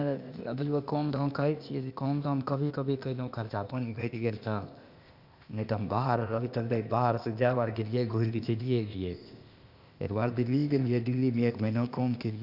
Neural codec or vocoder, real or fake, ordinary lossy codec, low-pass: codec, 16 kHz, 0.8 kbps, ZipCodec; fake; Opus, 64 kbps; 5.4 kHz